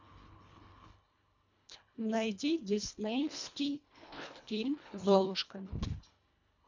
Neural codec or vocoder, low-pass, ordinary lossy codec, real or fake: codec, 24 kHz, 1.5 kbps, HILCodec; 7.2 kHz; MP3, 64 kbps; fake